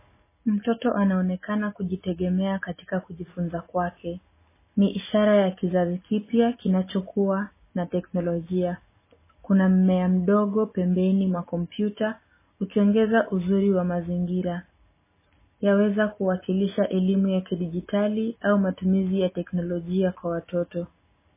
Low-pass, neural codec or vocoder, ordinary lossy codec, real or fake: 3.6 kHz; none; MP3, 16 kbps; real